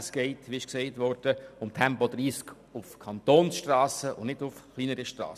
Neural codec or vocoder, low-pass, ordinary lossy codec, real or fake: none; 14.4 kHz; none; real